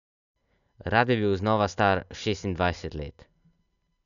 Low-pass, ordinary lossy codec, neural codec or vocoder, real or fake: 7.2 kHz; none; none; real